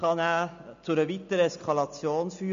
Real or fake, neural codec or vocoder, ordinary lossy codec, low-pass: real; none; MP3, 64 kbps; 7.2 kHz